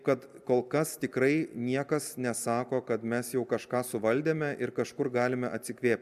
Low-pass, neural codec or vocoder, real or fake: 14.4 kHz; none; real